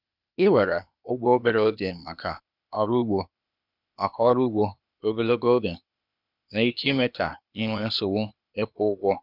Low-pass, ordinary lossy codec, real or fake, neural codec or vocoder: 5.4 kHz; none; fake; codec, 16 kHz, 0.8 kbps, ZipCodec